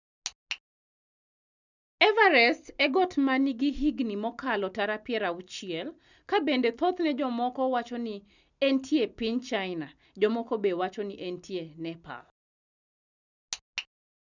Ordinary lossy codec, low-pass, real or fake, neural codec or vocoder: none; 7.2 kHz; real; none